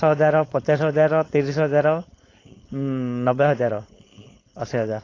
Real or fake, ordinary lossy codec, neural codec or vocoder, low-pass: fake; AAC, 32 kbps; codec, 16 kHz, 4.8 kbps, FACodec; 7.2 kHz